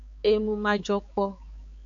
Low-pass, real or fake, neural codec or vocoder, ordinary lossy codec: 7.2 kHz; fake; codec, 16 kHz, 4 kbps, X-Codec, HuBERT features, trained on balanced general audio; AAC, 64 kbps